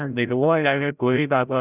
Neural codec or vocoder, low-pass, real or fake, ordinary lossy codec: codec, 16 kHz, 0.5 kbps, FreqCodec, larger model; 3.6 kHz; fake; none